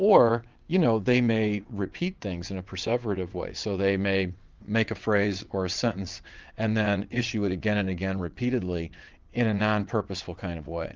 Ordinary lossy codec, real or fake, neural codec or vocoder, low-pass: Opus, 24 kbps; fake; vocoder, 22.05 kHz, 80 mel bands, WaveNeXt; 7.2 kHz